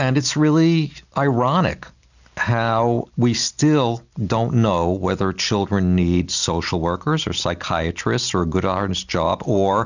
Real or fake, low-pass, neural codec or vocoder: real; 7.2 kHz; none